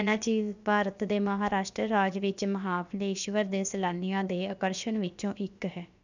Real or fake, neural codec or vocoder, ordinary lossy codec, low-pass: fake; codec, 16 kHz, about 1 kbps, DyCAST, with the encoder's durations; none; 7.2 kHz